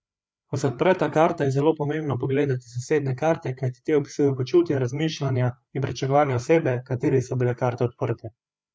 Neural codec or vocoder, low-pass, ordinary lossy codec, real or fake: codec, 16 kHz, 4 kbps, FreqCodec, larger model; none; none; fake